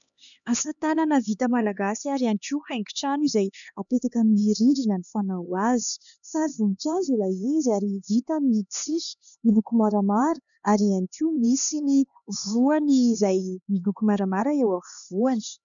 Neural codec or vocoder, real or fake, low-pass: codec, 16 kHz, 2 kbps, X-Codec, HuBERT features, trained on LibriSpeech; fake; 7.2 kHz